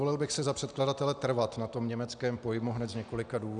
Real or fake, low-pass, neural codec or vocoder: real; 9.9 kHz; none